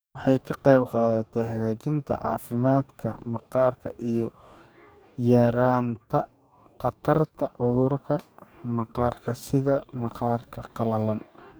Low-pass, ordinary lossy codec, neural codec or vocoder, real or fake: none; none; codec, 44.1 kHz, 2.6 kbps, DAC; fake